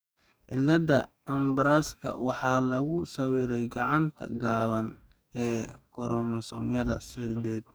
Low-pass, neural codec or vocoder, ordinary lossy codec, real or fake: none; codec, 44.1 kHz, 2.6 kbps, DAC; none; fake